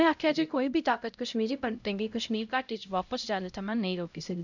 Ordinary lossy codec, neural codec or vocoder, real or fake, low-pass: none; codec, 16 kHz, 0.5 kbps, X-Codec, HuBERT features, trained on LibriSpeech; fake; 7.2 kHz